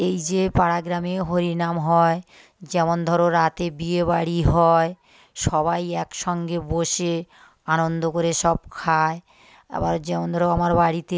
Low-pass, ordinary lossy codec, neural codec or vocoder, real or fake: none; none; none; real